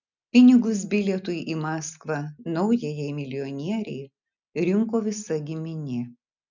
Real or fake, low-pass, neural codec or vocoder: real; 7.2 kHz; none